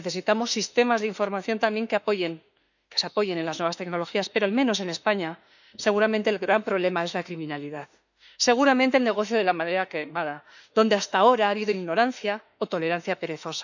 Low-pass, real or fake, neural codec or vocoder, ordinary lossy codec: 7.2 kHz; fake; autoencoder, 48 kHz, 32 numbers a frame, DAC-VAE, trained on Japanese speech; none